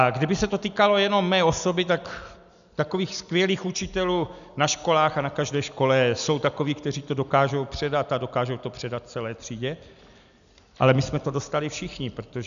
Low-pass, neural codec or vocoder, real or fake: 7.2 kHz; none; real